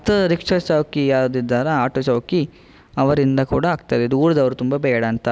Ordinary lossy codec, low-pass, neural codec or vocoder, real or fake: none; none; none; real